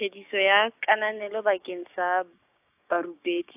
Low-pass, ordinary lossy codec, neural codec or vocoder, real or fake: 3.6 kHz; AAC, 32 kbps; codec, 16 kHz, 6 kbps, DAC; fake